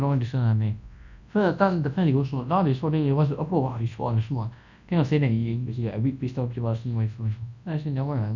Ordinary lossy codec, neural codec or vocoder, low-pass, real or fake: none; codec, 24 kHz, 0.9 kbps, WavTokenizer, large speech release; 7.2 kHz; fake